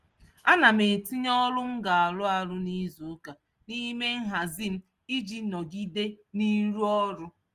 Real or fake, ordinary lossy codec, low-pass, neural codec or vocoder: real; Opus, 24 kbps; 14.4 kHz; none